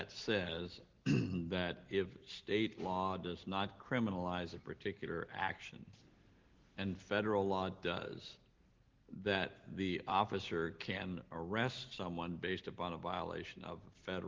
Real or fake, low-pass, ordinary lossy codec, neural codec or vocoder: real; 7.2 kHz; Opus, 16 kbps; none